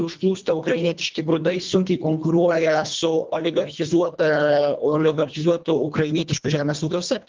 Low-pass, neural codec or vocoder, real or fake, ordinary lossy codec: 7.2 kHz; codec, 24 kHz, 1.5 kbps, HILCodec; fake; Opus, 32 kbps